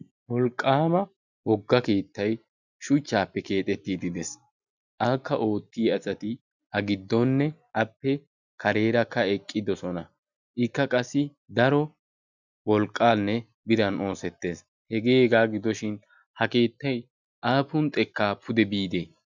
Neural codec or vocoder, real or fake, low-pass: none; real; 7.2 kHz